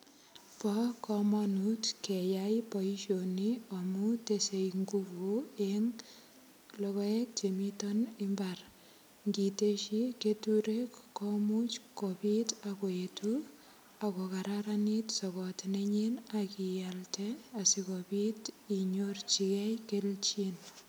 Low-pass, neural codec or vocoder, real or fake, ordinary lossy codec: none; none; real; none